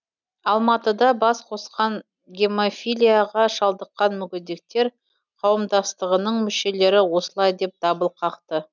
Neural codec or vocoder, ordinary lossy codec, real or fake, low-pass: none; none; real; 7.2 kHz